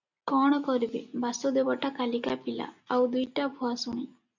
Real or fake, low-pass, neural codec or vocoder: real; 7.2 kHz; none